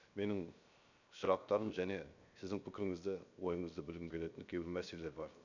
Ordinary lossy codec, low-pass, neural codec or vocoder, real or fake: none; 7.2 kHz; codec, 16 kHz, 0.7 kbps, FocalCodec; fake